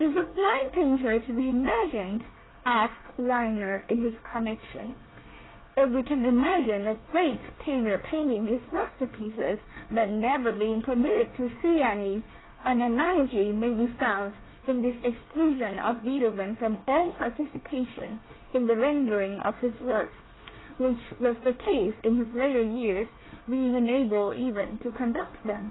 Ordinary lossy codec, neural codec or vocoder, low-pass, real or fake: AAC, 16 kbps; codec, 24 kHz, 1 kbps, SNAC; 7.2 kHz; fake